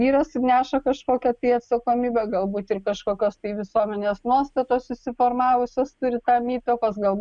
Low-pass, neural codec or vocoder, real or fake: 9.9 kHz; none; real